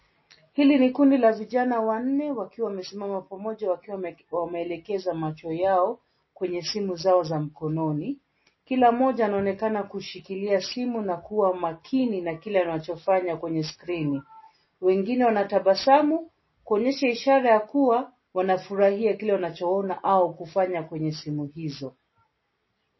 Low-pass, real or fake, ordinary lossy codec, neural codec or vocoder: 7.2 kHz; real; MP3, 24 kbps; none